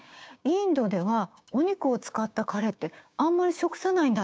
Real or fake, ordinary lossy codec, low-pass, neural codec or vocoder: fake; none; none; codec, 16 kHz, 6 kbps, DAC